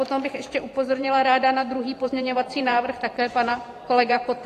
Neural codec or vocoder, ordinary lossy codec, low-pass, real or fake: vocoder, 44.1 kHz, 128 mel bands every 512 samples, BigVGAN v2; AAC, 48 kbps; 14.4 kHz; fake